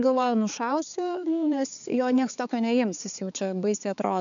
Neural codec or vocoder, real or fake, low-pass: codec, 16 kHz, 4 kbps, X-Codec, HuBERT features, trained on balanced general audio; fake; 7.2 kHz